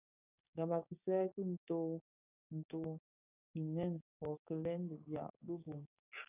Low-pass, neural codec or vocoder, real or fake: 3.6 kHz; codec, 16 kHz, 6 kbps, DAC; fake